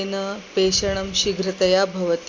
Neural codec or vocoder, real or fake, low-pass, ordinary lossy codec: none; real; 7.2 kHz; AAC, 48 kbps